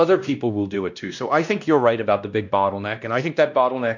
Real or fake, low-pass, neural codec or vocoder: fake; 7.2 kHz; codec, 16 kHz, 1 kbps, X-Codec, WavLM features, trained on Multilingual LibriSpeech